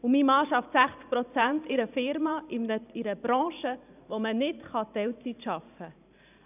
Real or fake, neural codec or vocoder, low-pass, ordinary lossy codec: fake; vocoder, 44.1 kHz, 128 mel bands every 512 samples, BigVGAN v2; 3.6 kHz; none